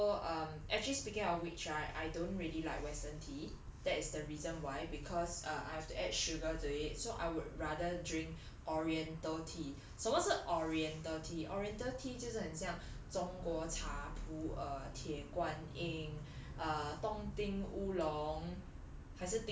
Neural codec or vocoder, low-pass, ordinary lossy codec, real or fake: none; none; none; real